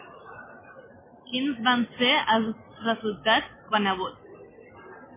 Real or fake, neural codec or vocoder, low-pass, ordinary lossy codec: real; none; 3.6 kHz; MP3, 16 kbps